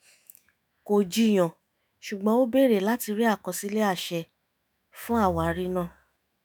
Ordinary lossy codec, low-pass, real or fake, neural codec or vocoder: none; none; fake; autoencoder, 48 kHz, 128 numbers a frame, DAC-VAE, trained on Japanese speech